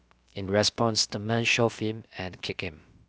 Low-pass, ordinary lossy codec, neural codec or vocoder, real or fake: none; none; codec, 16 kHz, about 1 kbps, DyCAST, with the encoder's durations; fake